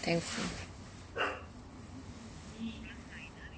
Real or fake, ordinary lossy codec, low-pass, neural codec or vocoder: real; none; none; none